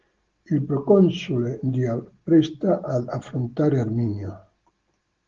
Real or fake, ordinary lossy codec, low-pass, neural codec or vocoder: real; Opus, 16 kbps; 7.2 kHz; none